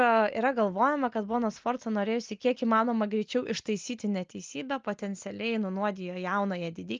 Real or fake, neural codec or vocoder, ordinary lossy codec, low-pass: real; none; Opus, 24 kbps; 7.2 kHz